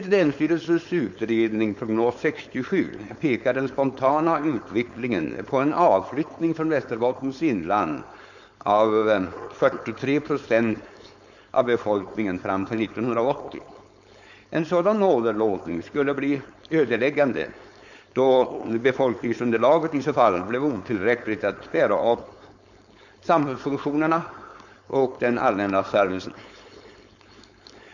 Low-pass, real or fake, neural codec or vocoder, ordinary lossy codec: 7.2 kHz; fake; codec, 16 kHz, 4.8 kbps, FACodec; none